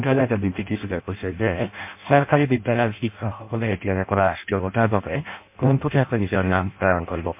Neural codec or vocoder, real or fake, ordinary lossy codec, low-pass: codec, 16 kHz in and 24 kHz out, 0.6 kbps, FireRedTTS-2 codec; fake; MP3, 32 kbps; 3.6 kHz